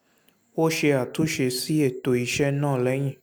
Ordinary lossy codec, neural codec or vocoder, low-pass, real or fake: none; none; none; real